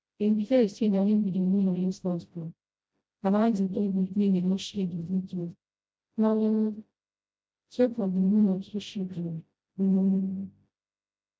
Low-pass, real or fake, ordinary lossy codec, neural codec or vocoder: none; fake; none; codec, 16 kHz, 0.5 kbps, FreqCodec, smaller model